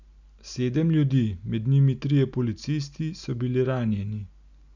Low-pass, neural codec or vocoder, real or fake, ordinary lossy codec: 7.2 kHz; none; real; none